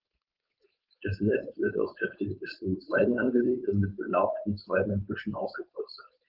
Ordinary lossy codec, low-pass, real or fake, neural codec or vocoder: Opus, 32 kbps; 5.4 kHz; fake; codec, 16 kHz, 4.8 kbps, FACodec